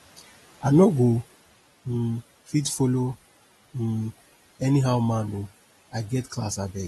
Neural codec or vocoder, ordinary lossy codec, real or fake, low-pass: none; AAC, 32 kbps; real; 19.8 kHz